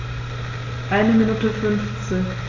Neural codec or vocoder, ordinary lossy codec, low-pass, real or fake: none; AAC, 32 kbps; 7.2 kHz; real